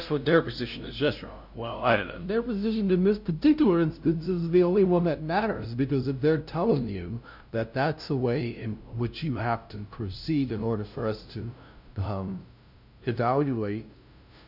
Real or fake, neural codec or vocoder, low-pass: fake; codec, 16 kHz, 0.5 kbps, FunCodec, trained on LibriTTS, 25 frames a second; 5.4 kHz